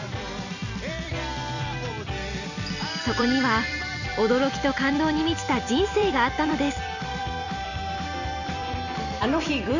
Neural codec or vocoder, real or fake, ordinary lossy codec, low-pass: vocoder, 44.1 kHz, 128 mel bands every 256 samples, BigVGAN v2; fake; none; 7.2 kHz